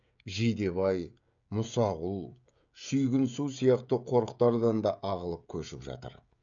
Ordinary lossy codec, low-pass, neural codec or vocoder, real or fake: none; 7.2 kHz; codec, 16 kHz, 16 kbps, FreqCodec, smaller model; fake